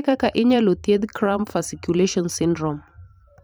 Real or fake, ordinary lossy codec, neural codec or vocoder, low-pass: fake; none; vocoder, 44.1 kHz, 128 mel bands every 512 samples, BigVGAN v2; none